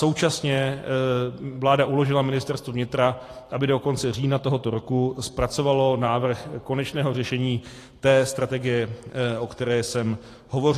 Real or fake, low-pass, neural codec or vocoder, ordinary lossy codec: fake; 14.4 kHz; vocoder, 44.1 kHz, 128 mel bands every 512 samples, BigVGAN v2; AAC, 48 kbps